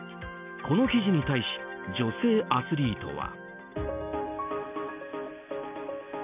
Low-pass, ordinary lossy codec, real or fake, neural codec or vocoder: 3.6 kHz; none; real; none